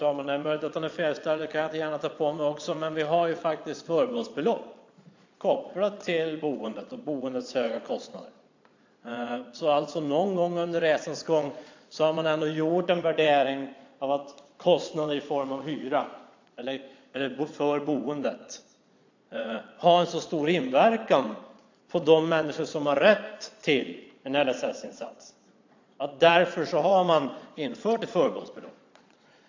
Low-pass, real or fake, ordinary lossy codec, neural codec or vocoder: 7.2 kHz; fake; AAC, 48 kbps; vocoder, 22.05 kHz, 80 mel bands, Vocos